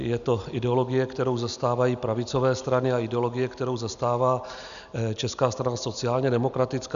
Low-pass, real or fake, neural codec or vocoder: 7.2 kHz; real; none